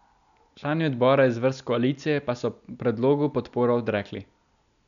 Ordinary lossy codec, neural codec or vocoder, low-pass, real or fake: none; none; 7.2 kHz; real